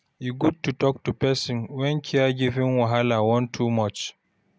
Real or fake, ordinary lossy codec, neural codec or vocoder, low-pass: real; none; none; none